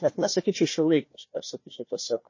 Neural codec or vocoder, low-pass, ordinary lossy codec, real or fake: codec, 16 kHz, 1 kbps, FunCodec, trained on Chinese and English, 50 frames a second; 7.2 kHz; MP3, 32 kbps; fake